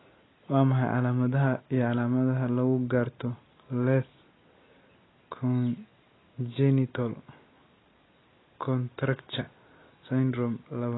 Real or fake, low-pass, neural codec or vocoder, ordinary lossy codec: real; 7.2 kHz; none; AAC, 16 kbps